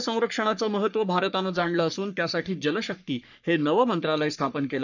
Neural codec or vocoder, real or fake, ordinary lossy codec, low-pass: codec, 44.1 kHz, 3.4 kbps, Pupu-Codec; fake; none; 7.2 kHz